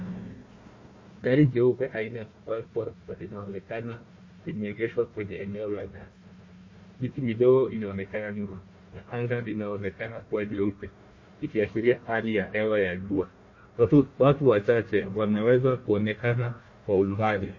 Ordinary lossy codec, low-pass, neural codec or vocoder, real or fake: MP3, 32 kbps; 7.2 kHz; codec, 16 kHz, 1 kbps, FunCodec, trained on Chinese and English, 50 frames a second; fake